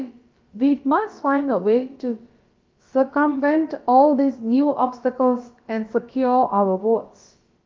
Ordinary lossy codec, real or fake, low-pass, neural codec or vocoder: Opus, 32 kbps; fake; 7.2 kHz; codec, 16 kHz, about 1 kbps, DyCAST, with the encoder's durations